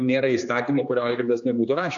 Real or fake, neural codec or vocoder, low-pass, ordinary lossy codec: fake; codec, 16 kHz, 2 kbps, X-Codec, HuBERT features, trained on general audio; 7.2 kHz; AAC, 64 kbps